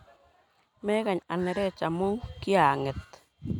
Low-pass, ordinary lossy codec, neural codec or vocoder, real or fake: 19.8 kHz; none; none; real